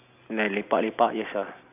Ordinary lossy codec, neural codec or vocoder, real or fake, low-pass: none; none; real; 3.6 kHz